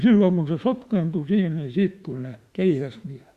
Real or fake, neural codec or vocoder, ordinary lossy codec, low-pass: fake; autoencoder, 48 kHz, 32 numbers a frame, DAC-VAE, trained on Japanese speech; Opus, 64 kbps; 14.4 kHz